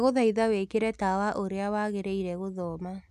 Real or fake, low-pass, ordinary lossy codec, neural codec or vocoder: real; 14.4 kHz; none; none